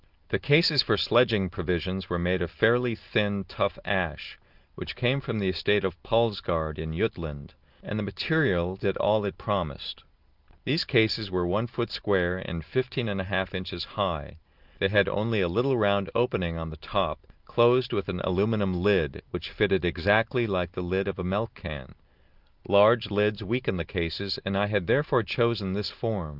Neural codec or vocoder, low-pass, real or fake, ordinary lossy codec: none; 5.4 kHz; real; Opus, 32 kbps